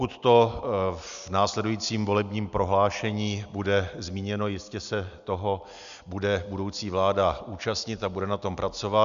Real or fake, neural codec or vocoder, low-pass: real; none; 7.2 kHz